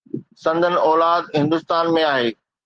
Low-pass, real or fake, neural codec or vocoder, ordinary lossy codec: 9.9 kHz; real; none; Opus, 16 kbps